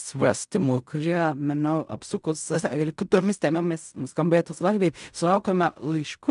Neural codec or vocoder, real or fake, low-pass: codec, 16 kHz in and 24 kHz out, 0.4 kbps, LongCat-Audio-Codec, fine tuned four codebook decoder; fake; 10.8 kHz